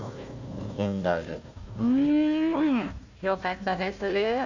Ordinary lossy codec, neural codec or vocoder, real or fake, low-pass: none; codec, 16 kHz, 1 kbps, FunCodec, trained on Chinese and English, 50 frames a second; fake; 7.2 kHz